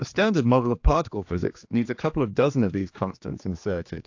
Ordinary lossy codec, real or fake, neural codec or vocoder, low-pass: AAC, 48 kbps; fake; codec, 16 kHz, 2 kbps, X-Codec, HuBERT features, trained on general audio; 7.2 kHz